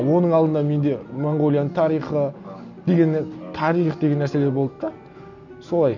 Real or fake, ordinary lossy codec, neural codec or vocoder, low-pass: real; none; none; 7.2 kHz